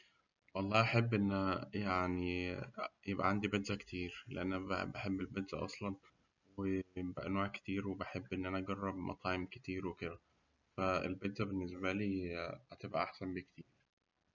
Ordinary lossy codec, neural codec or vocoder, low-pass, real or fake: none; none; 7.2 kHz; real